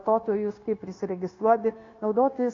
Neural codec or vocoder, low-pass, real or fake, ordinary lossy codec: codec, 16 kHz, 0.9 kbps, LongCat-Audio-Codec; 7.2 kHz; fake; AAC, 32 kbps